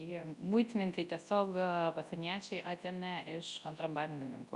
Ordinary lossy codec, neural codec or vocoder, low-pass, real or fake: Opus, 64 kbps; codec, 24 kHz, 0.9 kbps, WavTokenizer, large speech release; 10.8 kHz; fake